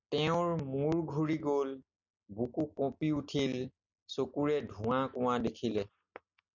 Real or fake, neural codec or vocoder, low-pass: real; none; 7.2 kHz